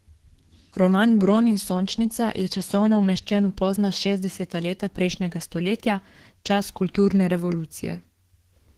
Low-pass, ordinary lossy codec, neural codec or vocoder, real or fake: 14.4 kHz; Opus, 16 kbps; codec, 32 kHz, 1.9 kbps, SNAC; fake